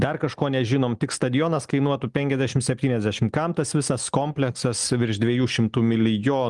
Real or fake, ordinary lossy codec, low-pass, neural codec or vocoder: real; Opus, 32 kbps; 10.8 kHz; none